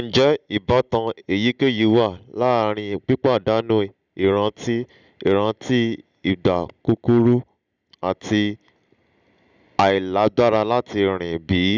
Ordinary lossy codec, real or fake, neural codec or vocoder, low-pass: none; real; none; 7.2 kHz